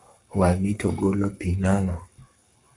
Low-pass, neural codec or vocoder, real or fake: 10.8 kHz; codec, 44.1 kHz, 7.8 kbps, Pupu-Codec; fake